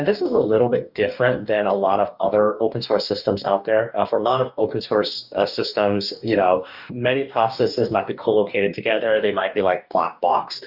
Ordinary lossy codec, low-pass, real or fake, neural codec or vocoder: Opus, 64 kbps; 5.4 kHz; fake; codec, 44.1 kHz, 2.6 kbps, DAC